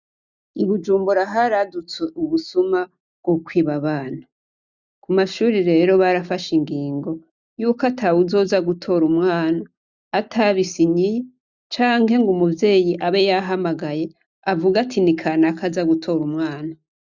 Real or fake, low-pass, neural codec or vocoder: real; 7.2 kHz; none